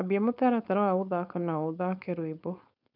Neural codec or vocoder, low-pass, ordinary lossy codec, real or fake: codec, 16 kHz, 4.8 kbps, FACodec; 5.4 kHz; none; fake